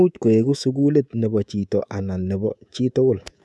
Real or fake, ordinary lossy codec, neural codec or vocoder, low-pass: fake; none; codec, 24 kHz, 3.1 kbps, DualCodec; none